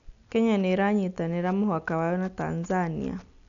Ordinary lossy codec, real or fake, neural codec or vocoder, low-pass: none; real; none; 7.2 kHz